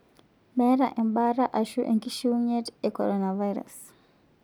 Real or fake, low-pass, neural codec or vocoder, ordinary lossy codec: real; none; none; none